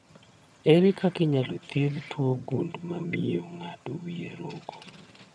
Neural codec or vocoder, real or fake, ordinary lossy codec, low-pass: vocoder, 22.05 kHz, 80 mel bands, HiFi-GAN; fake; none; none